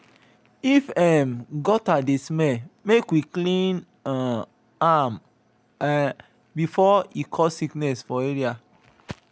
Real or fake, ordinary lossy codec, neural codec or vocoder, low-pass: real; none; none; none